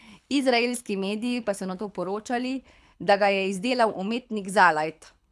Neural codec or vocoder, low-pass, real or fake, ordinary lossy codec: codec, 24 kHz, 6 kbps, HILCodec; none; fake; none